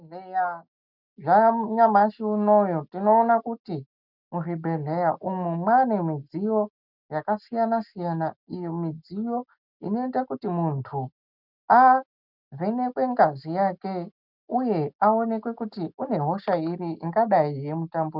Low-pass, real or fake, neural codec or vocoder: 5.4 kHz; real; none